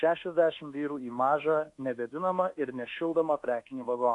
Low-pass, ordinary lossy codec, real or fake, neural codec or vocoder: 10.8 kHz; AAC, 48 kbps; fake; codec, 24 kHz, 1.2 kbps, DualCodec